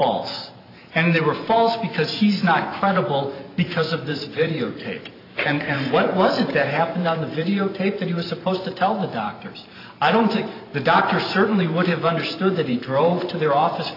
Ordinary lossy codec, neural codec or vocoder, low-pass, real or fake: AAC, 32 kbps; vocoder, 44.1 kHz, 128 mel bands every 512 samples, BigVGAN v2; 5.4 kHz; fake